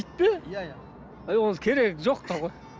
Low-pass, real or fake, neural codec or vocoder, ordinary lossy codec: none; real; none; none